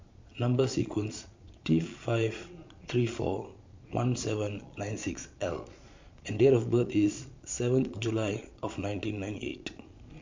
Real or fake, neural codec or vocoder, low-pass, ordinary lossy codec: fake; vocoder, 22.05 kHz, 80 mel bands, Vocos; 7.2 kHz; MP3, 48 kbps